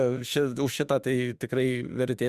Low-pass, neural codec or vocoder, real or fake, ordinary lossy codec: 14.4 kHz; autoencoder, 48 kHz, 32 numbers a frame, DAC-VAE, trained on Japanese speech; fake; Opus, 64 kbps